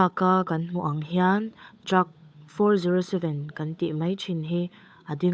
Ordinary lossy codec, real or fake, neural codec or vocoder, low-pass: none; fake; codec, 16 kHz, 8 kbps, FunCodec, trained on Chinese and English, 25 frames a second; none